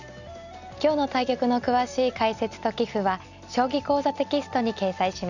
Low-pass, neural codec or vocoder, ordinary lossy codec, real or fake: 7.2 kHz; none; none; real